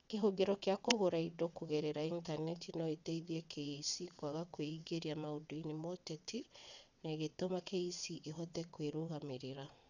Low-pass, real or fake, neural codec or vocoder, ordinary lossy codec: 7.2 kHz; fake; vocoder, 22.05 kHz, 80 mel bands, WaveNeXt; none